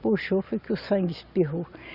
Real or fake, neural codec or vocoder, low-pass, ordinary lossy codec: real; none; 5.4 kHz; Opus, 64 kbps